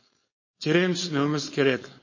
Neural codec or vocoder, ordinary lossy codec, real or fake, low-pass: codec, 16 kHz, 4.8 kbps, FACodec; MP3, 32 kbps; fake; 7.2 kHz